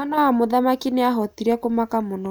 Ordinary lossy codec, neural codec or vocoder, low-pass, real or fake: none; none; none; real